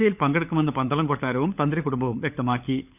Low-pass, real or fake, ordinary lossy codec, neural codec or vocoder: 3.6 kHz; fake; none; codec, 16 kHz, 16 kbps, FunCodec, trained on LibriTTS, 50 frames a second